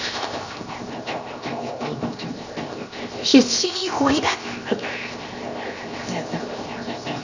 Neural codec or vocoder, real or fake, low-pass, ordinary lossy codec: codec, 16 kHz, 0.7 kbps, FocalCodec; fake; 7.2 kHz; none